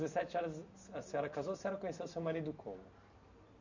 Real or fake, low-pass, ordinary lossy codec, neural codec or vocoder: real; 7.2 kHz; none; none